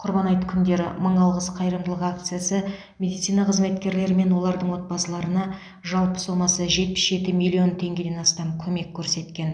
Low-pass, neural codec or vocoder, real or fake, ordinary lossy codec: 9.9 kHz; none; real; none